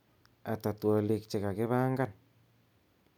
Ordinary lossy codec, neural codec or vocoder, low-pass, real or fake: none; none; 19.8 kHz; real